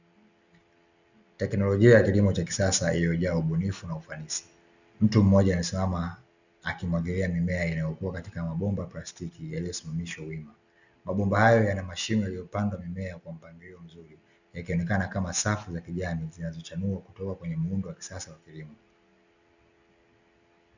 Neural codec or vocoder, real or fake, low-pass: none; real; 7.2 kHz